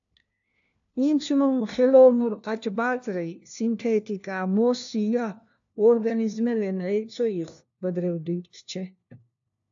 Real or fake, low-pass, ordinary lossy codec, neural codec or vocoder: fake; 7.2 kHz; AAC, 64 kbps; codec, 16 kHz, 1 kbps, FunCodec, trained on LibriTTS, 50 frames a second